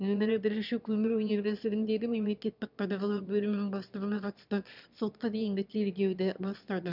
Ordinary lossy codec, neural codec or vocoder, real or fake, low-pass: none; autoencoder, 22.05 kHz, a latent of 192 numbers a frame, VITS, trained on one speaker; fake; 5.4 kHz